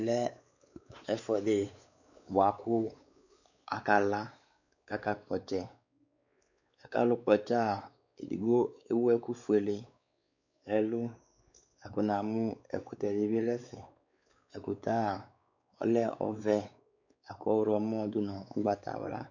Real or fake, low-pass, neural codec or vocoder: fake; 7.2 kHz; codec, 16 kHz, 4 kbps, X-Codec, WavLM features, trained on Multilingual LibriSpeech